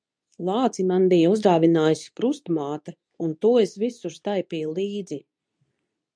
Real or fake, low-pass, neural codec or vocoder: fake; 9.9 kHz; codec, 24 kHz, 0.9 kbps, WavTokenizer, medium speech release version 2